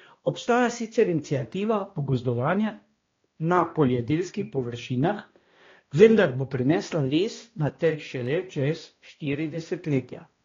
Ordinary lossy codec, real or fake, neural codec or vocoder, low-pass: AAC, 32 kbps; fake; codec, 16 kHz, 1 kbps, X-Codec, HuBERT features, trained on balanced general audio; 7.2 kHz